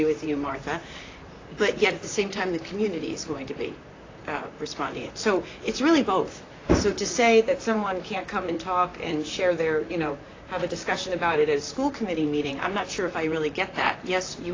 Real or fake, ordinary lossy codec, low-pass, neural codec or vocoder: fake; AAC, 32 kbps; 7.2 kHz; vocoder, 44.1 kHz, 128 mel bands, Pupu-Vocoder